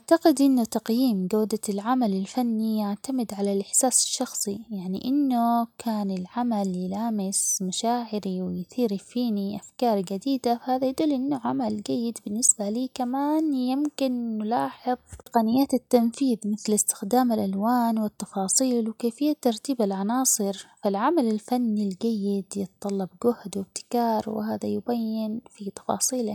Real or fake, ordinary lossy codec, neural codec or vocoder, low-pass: real; none; none; 19.8 kHz